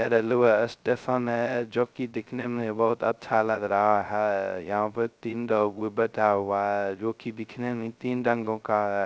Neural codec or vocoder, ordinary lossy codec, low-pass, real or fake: codec, 16 kHz, 0.2 kbps, FocalCodec; none; none; fake